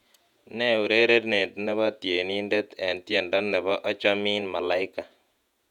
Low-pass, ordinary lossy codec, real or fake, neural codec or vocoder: 19.8 kHz; none; fake; vocoder, 48 kHz, 128 mel bands, Vocos